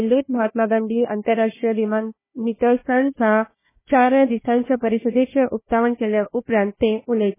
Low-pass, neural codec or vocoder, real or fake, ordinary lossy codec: 3.6 kHz; codec, 16 kHz, 1 kbps, FunCodec, trained on Chinese and English, 50 frames a second; fake; MP3, 16 kbps